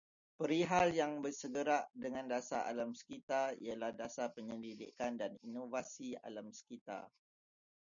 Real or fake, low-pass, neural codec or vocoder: real; 7.2 kHz; none